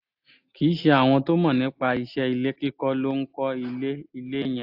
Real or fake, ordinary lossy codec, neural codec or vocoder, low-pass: real; AAC, 48 kbps; none; 5.4 kHz